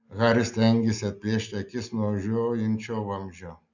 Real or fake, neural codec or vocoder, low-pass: real; none; 7.2 kHz